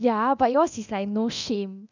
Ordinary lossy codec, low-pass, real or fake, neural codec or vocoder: none; 7.2 kHz; fake; codec, 24 kHz, 0.9 kbps, DualCodec